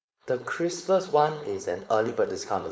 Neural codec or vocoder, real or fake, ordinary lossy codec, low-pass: codec, 16 kHz, 4.8 kbps, FACodec; fake; none; none